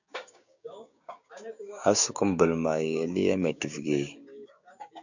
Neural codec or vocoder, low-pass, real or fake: codec, 16 kHz, 6 kbps, DAC; 7.2 kHz; fake